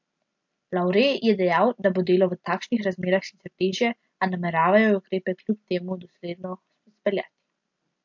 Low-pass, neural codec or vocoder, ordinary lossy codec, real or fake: 7.2 kHz; none; MP3, 48 kbps; real